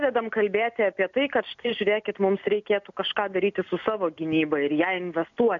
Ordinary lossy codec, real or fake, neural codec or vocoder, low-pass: MP3, 96 kbps; real; none; 7.2 kHz